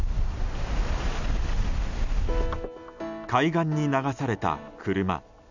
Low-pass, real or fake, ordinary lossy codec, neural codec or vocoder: 7.2 kHz; real; none; none